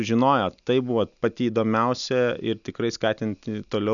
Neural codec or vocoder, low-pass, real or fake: none; 7.2 kHz; real